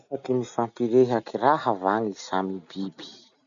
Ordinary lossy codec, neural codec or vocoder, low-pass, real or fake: none; none; 7.2 kHz; real